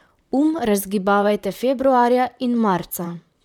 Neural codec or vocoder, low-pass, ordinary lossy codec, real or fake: vocoder, 44.1 kHz, 128 mel bands, Pupu-Vocoder; 19.8 kHz; none; fake